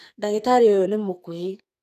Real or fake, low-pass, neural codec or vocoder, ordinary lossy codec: fake; 14.4 kHz; codec, 44.1 kHz, 2.6 kbps, SNAC; none